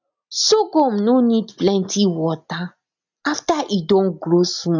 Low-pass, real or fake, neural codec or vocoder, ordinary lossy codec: 7.2 kHz; real; none; none